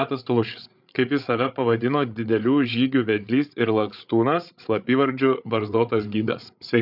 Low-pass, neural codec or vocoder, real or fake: 5.4 kHz; codec, 16 kHz, 8 kbps, FreqCodec, larger model; fake